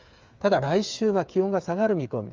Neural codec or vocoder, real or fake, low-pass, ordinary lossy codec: codec, 16 kHz, 8 kbps, FreqCodec, smaller model; fake; 7.2 kHz; Opus, 32 kbps